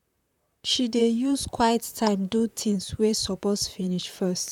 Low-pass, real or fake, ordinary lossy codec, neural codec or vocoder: 19.8 kHz; fake; none; vocoder, 44.1 kHz, 128 mel bands, Pupu-Vocoder